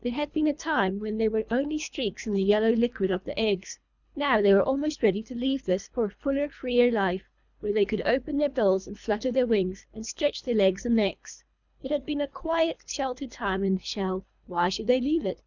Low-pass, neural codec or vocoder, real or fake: 7.2 kHz; codec, 24 kHz, 3 kbps, HILCodec; fake